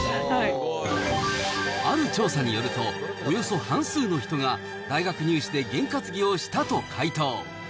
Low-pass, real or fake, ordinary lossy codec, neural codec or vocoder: none; real; none; none